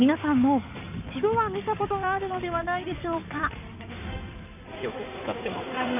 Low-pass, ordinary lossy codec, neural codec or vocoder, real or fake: 3.6 kHz; none; codec, 16 kHz in and 24 kHz out, 2.2 kbps, FireRedTTS-2 codec; fake